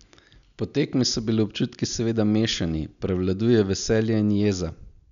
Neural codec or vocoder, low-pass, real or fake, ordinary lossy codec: none; 7.2 kHz; real; none